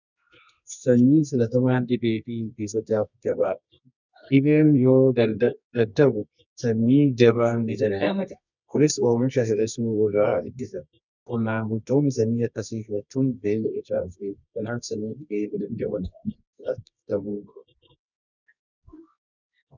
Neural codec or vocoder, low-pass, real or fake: codec, 24 kHz, 0.9 kbps, WavTokenizer, medium music audio release; 7.2 kHz; fake